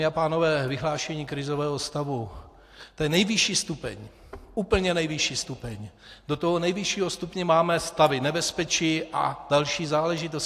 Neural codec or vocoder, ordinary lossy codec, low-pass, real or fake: none; AAC, 64 kbps; 14.4 kHz; real